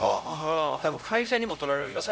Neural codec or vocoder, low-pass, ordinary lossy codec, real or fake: codec, 16 kHz, 0.5 kbps, X-Codec, HuBERT features, trained on LibriSpeech; none; none; fake